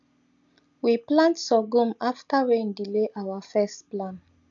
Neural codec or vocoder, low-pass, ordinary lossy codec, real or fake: none; 7.2 kHz; none; real